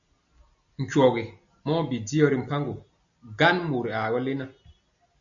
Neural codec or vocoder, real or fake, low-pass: none; real; 7.2 kHz